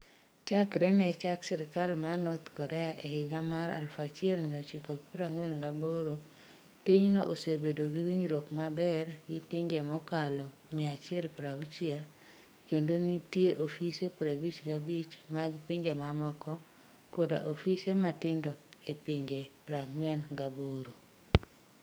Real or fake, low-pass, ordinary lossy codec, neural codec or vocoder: fake; none; none; codec, 44.1 kHz, 2.6 kbps, SNAC